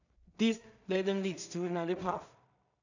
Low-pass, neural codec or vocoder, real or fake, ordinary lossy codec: 7.2 kHz; codec, 16 kHz in and 24 kHz out, 0.4 kbps, LongCat-Audio-Codec, two codebook decoder; fake; none